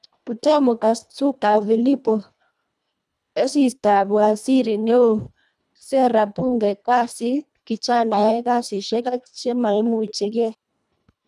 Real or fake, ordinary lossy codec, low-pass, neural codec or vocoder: fake; none; none; codec, 24 kHz, 1.5 kbps, HILCodec